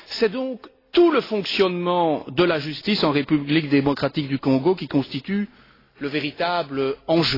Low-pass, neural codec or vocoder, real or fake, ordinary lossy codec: 5.4 kHz; none; real; AAC, 24 kbps